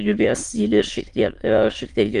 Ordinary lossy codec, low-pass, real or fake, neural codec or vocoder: Opus, 24 kbps; 9.9 kHz; fake; autoencoder, 22.05 kHz, a latent of 192 numbers a frame, VITS, trained on many speakers